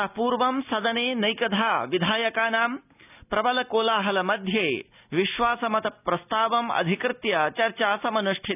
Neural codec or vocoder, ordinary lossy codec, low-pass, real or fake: none; none; 3.6 kHz; real